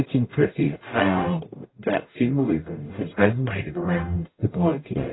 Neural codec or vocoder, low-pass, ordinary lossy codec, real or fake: codec, 44.1 kHz, 0.9 kbps, DAC; 7.2 kHz; AAC, 16 kbps; fake